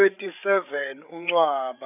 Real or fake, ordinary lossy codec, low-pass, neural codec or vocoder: fake; none; 3.6 kHz; codec, 16 kHz, 8 kbps, FreqCodec, larger model